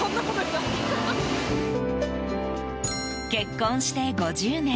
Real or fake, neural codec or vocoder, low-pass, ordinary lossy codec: real; none; none; none